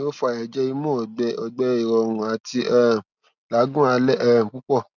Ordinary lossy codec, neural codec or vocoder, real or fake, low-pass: none; none; real; 7.2 kHz